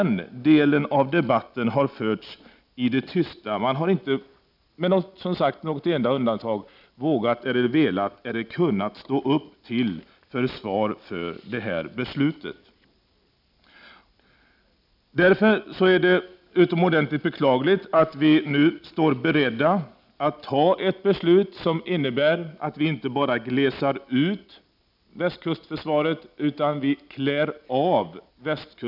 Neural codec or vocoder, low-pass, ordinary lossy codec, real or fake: none; 5.4 kHz; none; real